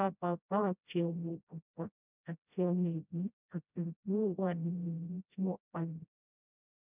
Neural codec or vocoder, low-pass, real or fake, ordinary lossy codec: codec, 16 kHz, 0.5 kbps, FreqCodec, smaller model; 3.6 kHz; fake; none